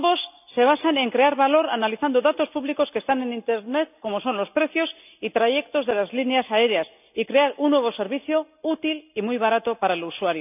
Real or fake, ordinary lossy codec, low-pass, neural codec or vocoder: real; none; 3.6 kHz; none